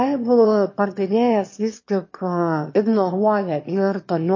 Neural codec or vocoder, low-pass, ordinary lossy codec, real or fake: autoencoder, 22.05 kHz, a latent of 192 numbers a frame, VITS, trained on one speaker; 7.2 kHz; MP3, 32 kbps; fake